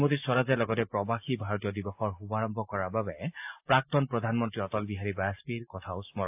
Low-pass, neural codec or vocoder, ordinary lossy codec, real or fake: 3.6 kHz; none; AAC, 32 kbps; real